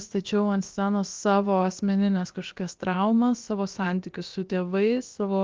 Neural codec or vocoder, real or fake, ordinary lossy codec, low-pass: codec, 16 kHz, about 1 kbps, DyCAST, with the encoder's durations; fake; Opus, 24 kbps; 7.2 kHz